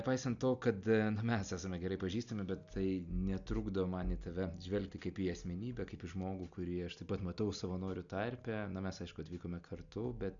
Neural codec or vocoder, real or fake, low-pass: none; real; 7.2 kHz